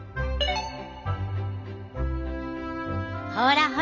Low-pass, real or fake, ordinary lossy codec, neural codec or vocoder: 7.2 kHz; real; none; none